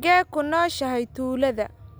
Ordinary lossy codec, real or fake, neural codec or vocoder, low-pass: none; real; none; none